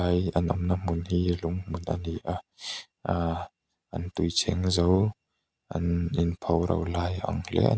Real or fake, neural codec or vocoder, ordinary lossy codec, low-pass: real; none; none; none